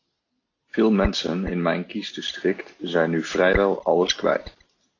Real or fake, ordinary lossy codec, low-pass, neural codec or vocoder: real; AAC, 32 kbps; 7.2 kHz; none